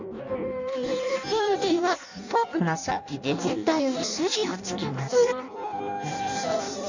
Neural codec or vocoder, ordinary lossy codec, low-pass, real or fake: codec, 16 kHz in and 24 kHz out, 0.6 kbps, FireRedTTS-2 codec; none; 7.2 kHz; fake